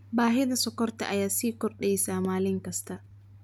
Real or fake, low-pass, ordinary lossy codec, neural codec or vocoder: real; none; none; none